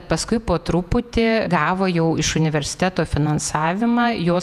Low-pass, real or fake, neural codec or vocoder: 14.4 kHz; fake; vocoder, 48 kHz, 128 mel bands, Vocos